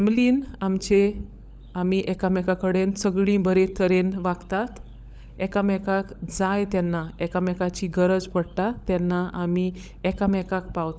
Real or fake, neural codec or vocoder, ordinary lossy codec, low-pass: fake; codec, 16 kHz, 16 kbps, FunCodec, trained on LibriTTS, 50 frames a second; none; none